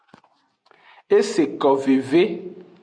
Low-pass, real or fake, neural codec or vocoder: 9.9 kHz; real; none